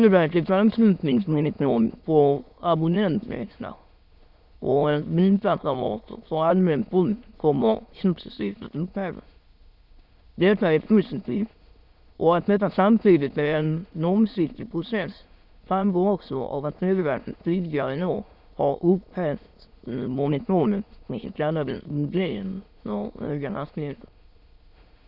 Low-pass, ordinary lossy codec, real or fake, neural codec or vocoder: 5.4 kHz; Opus, 64 kbps; fake; autoencoder, 22.05 kHz, a latent of 192 numbers a frame, VITS, trained on many speakers